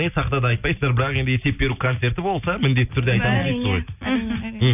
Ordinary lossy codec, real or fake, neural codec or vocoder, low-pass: none; real; none; 3.6 kHz